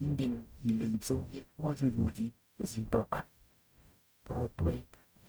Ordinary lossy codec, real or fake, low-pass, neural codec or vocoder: none; fake; none; codec, 44.1 kHz, 0.9 kbps, DAC